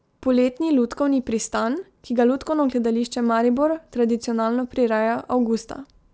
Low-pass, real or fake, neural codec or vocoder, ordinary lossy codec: none; real; none; none